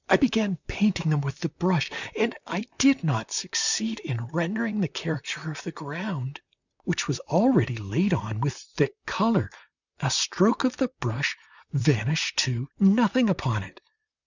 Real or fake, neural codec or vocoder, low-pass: fake; vocoder, 44.1 kHz, 128 mel bands, Pupu-Vocoder; 7.2 kHz